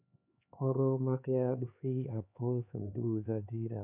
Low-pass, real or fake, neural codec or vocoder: 3.6 kHz; fake; codec, 16 kHz, 4 kbps, X-Codec, HuBERT features, trained on LibriSpeech